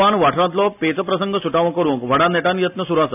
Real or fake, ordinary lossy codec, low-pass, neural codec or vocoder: real; none; 3.6 kHz; none